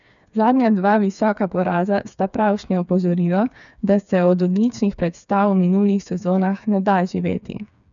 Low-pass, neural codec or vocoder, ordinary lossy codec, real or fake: 7.2 kHz; codec, 16 kHz, 4 kbps, FreqCodec, smaller model; none; fake